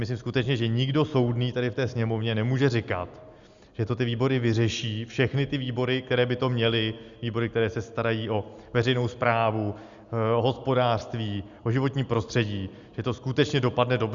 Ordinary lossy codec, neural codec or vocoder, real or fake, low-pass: Opus, 64 kbps; none; real; 7.2 kHz